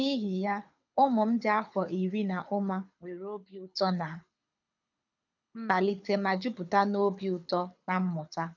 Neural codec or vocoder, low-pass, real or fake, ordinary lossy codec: codec, 24 kHz, 6 kbps, HILCodec; 7.2 kHz; fake; none